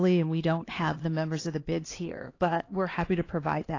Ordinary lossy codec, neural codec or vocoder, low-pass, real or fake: AAC, 32 kbps; codec, 16 kHz, 2 kbps, X-Codec, HuBERT features, trained on LibriSpeech; 7.2 kHz; fake